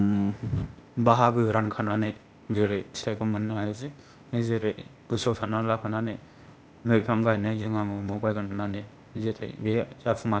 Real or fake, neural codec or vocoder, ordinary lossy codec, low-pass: fake; codec, 16 kHz, 0.8 kbps, ZipCodec; none; none